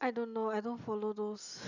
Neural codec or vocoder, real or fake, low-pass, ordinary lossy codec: none; real; 7.2 kHz; none